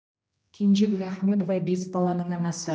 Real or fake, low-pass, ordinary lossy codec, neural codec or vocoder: fake; none; none; codec, 16 kHz, 1 kbps, X-Codec, HuBERT features, trained on general audio